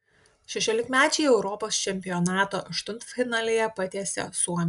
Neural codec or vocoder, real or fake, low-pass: none; real; 10.8 kHz